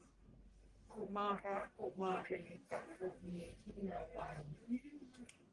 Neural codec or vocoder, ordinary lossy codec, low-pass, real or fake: codec, 44.1 kHz, 1.7 kbps, Pupu-Codec; Opus, 24 kbps; 10.8 kHz; fake